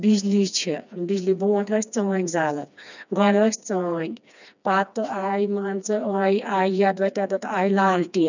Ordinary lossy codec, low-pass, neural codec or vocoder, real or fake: none; 7.2 kHz; codec, 16 kHz, 2 kbps, FreqCodec, smaller model; fake